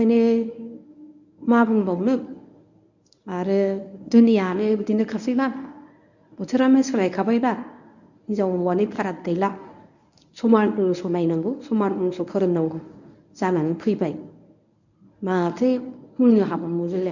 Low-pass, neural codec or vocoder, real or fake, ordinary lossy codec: 7.2 kHz; codec, 24 kHz, 0.9 kbps, WavTokenizer, medium speech release version 1; fake; none